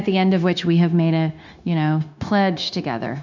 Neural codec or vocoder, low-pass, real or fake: codec, 16 kHz, 0.9 kbps, LongCat-Audio-Codec; 7.2 kHz; fake